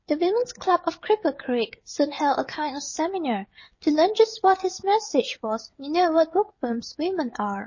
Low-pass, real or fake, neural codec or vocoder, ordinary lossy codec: 7.2 kHz; fake; codec, 16 kHz, 16 kbps, FreqCodec, smaller model; MP3, 32 kbps